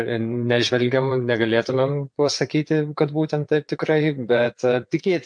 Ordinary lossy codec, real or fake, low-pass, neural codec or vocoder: MP3, 64 kbps; fake; 9.9 kHz; vocoder, 22.05 kHz, 80 mel bands, WaveNeXt